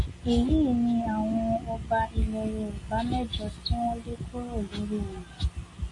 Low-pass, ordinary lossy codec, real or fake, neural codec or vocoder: 10.8 kHz; MP3, 48 kbps; real; none